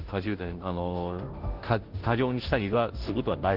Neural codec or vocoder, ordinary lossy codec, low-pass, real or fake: codec, 16 kHz, 0.5 kbps, FunCodec, trained on Chinese and English, 25 frames a second; Opus, 16 kbps; 5.4 kHz; fake